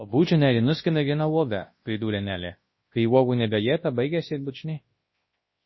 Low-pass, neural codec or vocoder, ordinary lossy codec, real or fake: 7.2 kHz; codec, 24 kHz, 0.9 kbps, WavTokenizer, large speech release; MP3, 24 kbps; fake